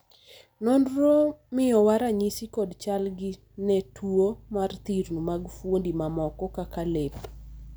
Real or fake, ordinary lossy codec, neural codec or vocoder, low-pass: real; none; none; none